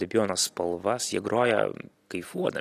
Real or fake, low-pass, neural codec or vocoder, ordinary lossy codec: real; 14.4 kHz; none; AAC, 48 kbps